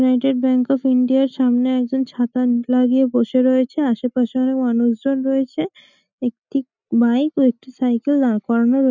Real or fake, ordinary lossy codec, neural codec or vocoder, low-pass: real; none; none; 7.2 kHz